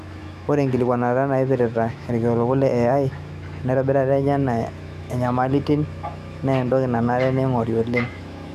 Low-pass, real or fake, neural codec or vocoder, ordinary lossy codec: 14.4 kHz; fake; autoencoder, 48 kHz, 128 numbers a frame, DAC-VAE, trained on Japanese speech; none